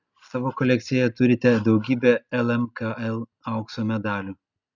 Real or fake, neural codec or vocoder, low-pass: real; none; 7.2 kHz